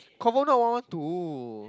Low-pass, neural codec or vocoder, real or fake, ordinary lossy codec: none; none; real; none